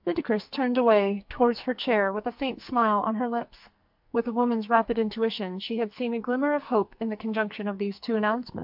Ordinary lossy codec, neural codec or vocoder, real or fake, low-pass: MP3, 48 kbps; codec, 44.1 kHz, 2.6 kbps, SNAC; fake; 5.4 kHz